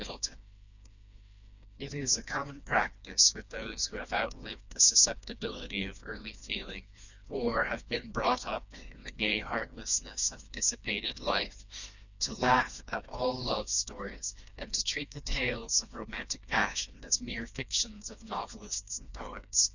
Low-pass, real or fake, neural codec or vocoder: 7.2 kHz; fake; codec, 16 kHz, 2 kbps, FreqCodec, smaller model